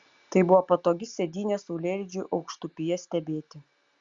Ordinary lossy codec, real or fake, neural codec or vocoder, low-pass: Opus, 64 kbps; real; none; 7.2 kHz